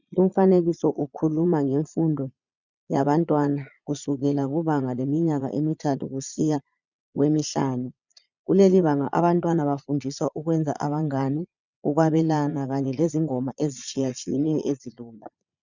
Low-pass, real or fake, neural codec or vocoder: 7.2 kHz; fake; vocoder, 22.05 kHz, 80 mel bands, Vocos